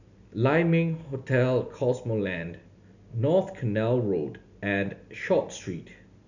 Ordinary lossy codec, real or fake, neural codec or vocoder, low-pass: none; real; none; 7.2 kHz